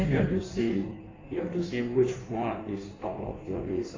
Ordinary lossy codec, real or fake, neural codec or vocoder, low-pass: AAC, 32 kbps; fake; codec, 16 kHz in and 24 kHz out, 1.1 kbps, FireRedTTS-2 codec; 7.2 kHz